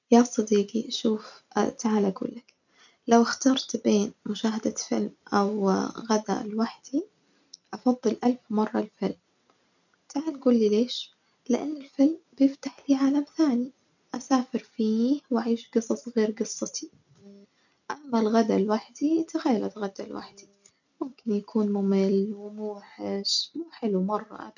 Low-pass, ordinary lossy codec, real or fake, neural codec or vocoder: 7.2 kHz; none; real; none